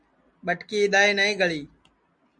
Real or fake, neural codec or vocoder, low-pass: real; none; 9.9 kHz